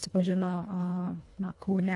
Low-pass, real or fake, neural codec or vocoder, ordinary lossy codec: 10.8 kHz; fake; codec, 24 kHz, 1.5 kbps, HILCodec; AAC, 48 kbps